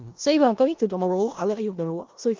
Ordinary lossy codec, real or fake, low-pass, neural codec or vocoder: Opus, 32 kbps; fake; 7.2 kHz; codec, 16 kHz in and 24 kHz out, 0.4 kbps, LongCat-Audio-Codec, four codebook decoder